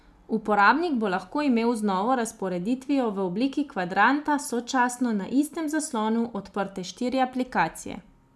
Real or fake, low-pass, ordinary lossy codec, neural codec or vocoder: real; none; none; none